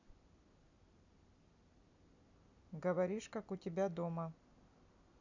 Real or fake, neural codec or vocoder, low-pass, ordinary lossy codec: real; none; 7.2 kHz; none